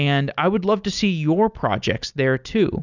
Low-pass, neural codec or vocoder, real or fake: 7.2 kHz; none; real